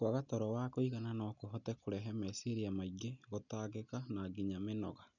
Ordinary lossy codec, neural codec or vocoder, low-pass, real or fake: none; none; 7.2 kHz; real